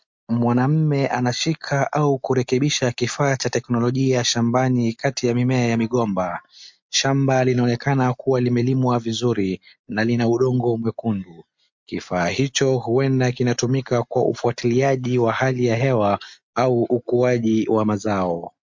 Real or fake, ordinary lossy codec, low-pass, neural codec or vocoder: real; MP3, 48 kbps; 7.2 kHz; none